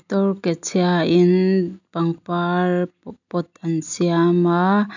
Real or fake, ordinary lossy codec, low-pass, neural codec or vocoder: real; none; 7.2 kHz; none